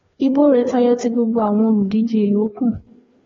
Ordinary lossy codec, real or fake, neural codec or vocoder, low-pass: AAC, 24 kbps; fake; codec, 16 kHz, 2 kbps, FreqCodec, larger model; 7.2 kHz